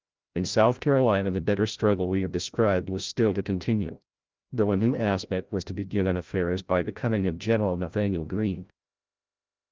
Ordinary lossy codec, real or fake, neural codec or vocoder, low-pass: Opus, 32 kbps; fake; codec, 16 kHz, 0.5 kbps, FreqCodec, larger model; 7.2 kHz